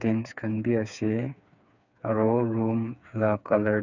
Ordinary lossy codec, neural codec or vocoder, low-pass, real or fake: none; codec, 16 kHz, 4 kbps, FreqCodec, smaller model; 7.2 kHz; fake